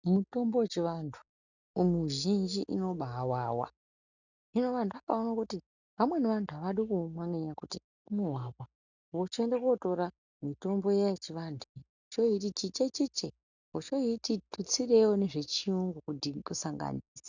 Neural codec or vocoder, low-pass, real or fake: none; 7.2 kHz; real